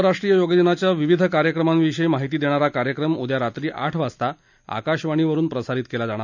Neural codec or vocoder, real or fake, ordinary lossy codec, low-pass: none; real; none; 7.2 kHz